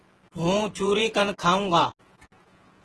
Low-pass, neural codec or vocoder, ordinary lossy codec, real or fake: 10.8 kHz; vocoder, 48 kHz, 128 mel bands, Vocos; Opus, 16 kbps; fake